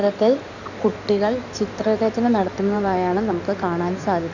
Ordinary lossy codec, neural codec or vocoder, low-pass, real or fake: none; codec, 16 kHz in and 24 kHz out, 2.2 kbps, FireRedTTS-2 codec; 7.2 kHz; fake